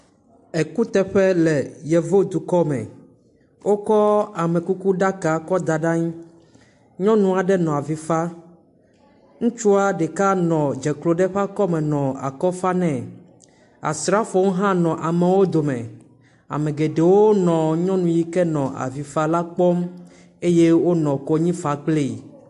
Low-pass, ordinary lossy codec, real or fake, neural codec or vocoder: 10.8 kHz; AAC, 64 kbps; real; none